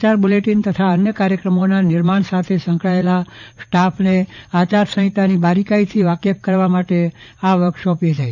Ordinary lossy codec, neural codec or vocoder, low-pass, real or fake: none; vocoder, 44.1 kHz, 80 mel bands, Vocos; 7.2 kHz; fake